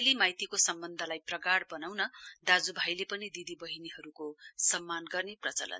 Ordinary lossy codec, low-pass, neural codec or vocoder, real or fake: none; none; none; real